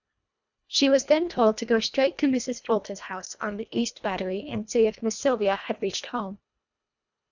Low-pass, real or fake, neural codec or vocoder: 7.2 kHz; fake; codec, 24 kHz, 1.5 kbps, HILCodec